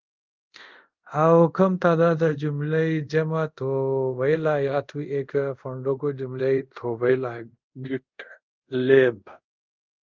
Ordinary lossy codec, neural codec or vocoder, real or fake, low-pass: Opus, 32 kbps; codec, 24 kHz, 0.5 kbps, DualCodec; fake; 7.2 kHz